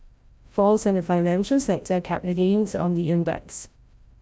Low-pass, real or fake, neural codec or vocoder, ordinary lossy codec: none; fake; codec, 16 kHz, 0.5 kbps, FreqCodec, larger model; none